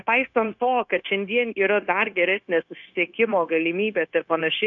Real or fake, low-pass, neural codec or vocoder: fake; 7.2 kHz; codec, 16 kHz, 0.9 kbps, LongCat-Audio-Codec